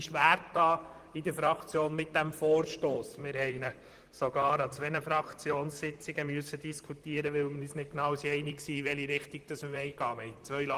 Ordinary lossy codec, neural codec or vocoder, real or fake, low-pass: Opus, 24 kbps; vocoder, 44.1 kHz, 128 mel bands, Pupu-Vocoder; fake; 14.4 kHz